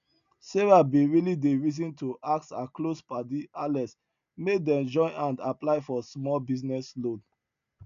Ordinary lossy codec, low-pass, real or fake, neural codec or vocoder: none; 7.2 kHz; real; none